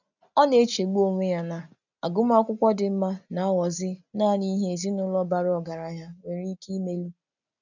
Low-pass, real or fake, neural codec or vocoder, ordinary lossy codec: 7.2 kHz; real; none; none